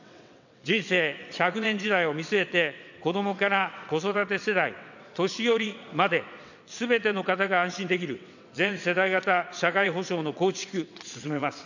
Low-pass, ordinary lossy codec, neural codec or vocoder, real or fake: 7.2 kHz; none; vocoder, 22.05 kHz, 80 mel bands, WaveNeXt; fake